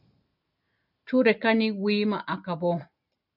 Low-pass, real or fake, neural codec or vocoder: 5.4 kHz; real; none